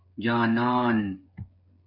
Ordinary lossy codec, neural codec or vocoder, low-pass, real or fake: AAC, 48 kbps; codec, 16 kHz, 8 kbps, FreqCodec, smaller model; 5.4 kHz; fake